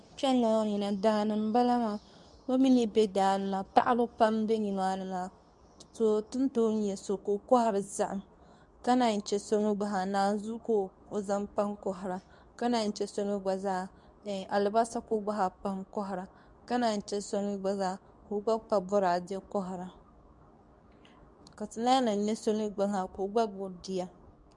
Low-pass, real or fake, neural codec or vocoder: 10.8 kHz; fake; codec, 24 kHz, 0.9 kbps, WavTokenizer, medium speech release version 2